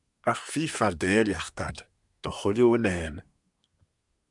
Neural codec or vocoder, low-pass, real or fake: codec, 24 kHz, 1 kbps, SNAC; 10.8 kHz; fake